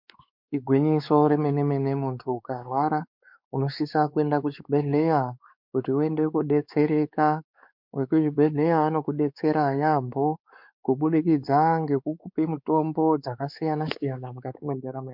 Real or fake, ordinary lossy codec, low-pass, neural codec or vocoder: fake; MP3, 48 kbps; 5.4 kHz; codec, 16 kHz, 4 kbps, X-Codec, WavLM features, trained on Multilingual LibriSpeech